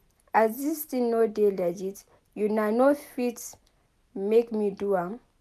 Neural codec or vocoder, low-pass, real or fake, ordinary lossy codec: none; 14.4 kHz; real; none